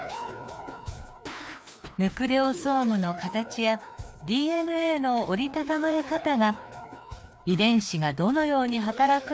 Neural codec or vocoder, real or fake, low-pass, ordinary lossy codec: codec, 16 kHz, 2 kbps, FreqCodec, larger model; fake; none; none